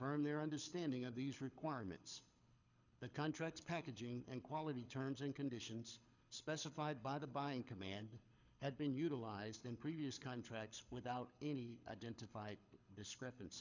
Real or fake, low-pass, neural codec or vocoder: fake; 7.2 kHz; codec, 24 kHz, 6 kbps, HILCodec